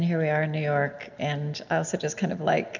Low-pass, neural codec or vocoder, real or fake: 7.2 kHz; none; real